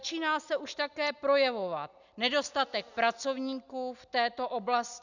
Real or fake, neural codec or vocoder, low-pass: real; none; 7.2 kHz